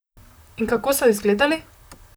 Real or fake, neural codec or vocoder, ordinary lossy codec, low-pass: fake; vocoder, 44.1 kHz, 128 mel bands, Pupu-Vocoder; none; none